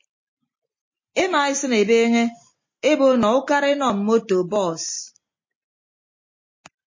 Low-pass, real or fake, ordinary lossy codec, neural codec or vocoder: 7.2 kHz; real; MP3, 32 kbps; none